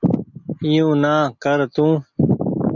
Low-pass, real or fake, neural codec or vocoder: 7.2 kHz; real; none